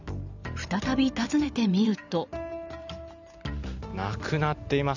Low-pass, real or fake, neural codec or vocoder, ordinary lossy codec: 7.2 kHz; fake; vocoder, 44.1 kHz, 128 mel bands every 512 samples, BigVGAN v2; none